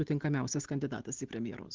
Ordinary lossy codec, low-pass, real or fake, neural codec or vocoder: Opus, 16 kbps; 7.2 kHz; real; none